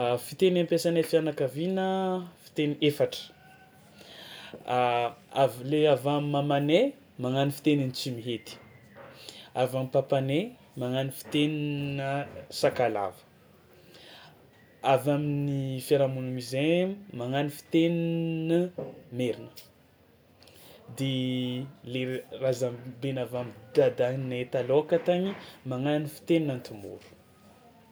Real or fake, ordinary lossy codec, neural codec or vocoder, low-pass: real; none; none; none